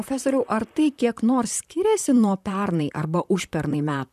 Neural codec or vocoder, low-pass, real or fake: vocoder, 44.1 kHz, 128 mel bands, Pupu-Vocoder; 14.4 kHz; fake